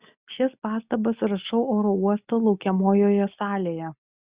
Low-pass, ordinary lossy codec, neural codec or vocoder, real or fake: 3.6 kHz; Opus, 64 kbps; none; real